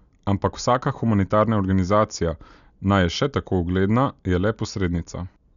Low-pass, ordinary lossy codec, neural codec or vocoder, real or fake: 7.2 kHz; none; none; real